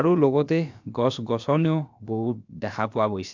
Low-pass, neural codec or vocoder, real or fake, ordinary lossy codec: 7.2 kHz; codec, 16 kHz, about 1 kbps, DyCAST, with the encoder's durations; fake; MP3, 64 kbps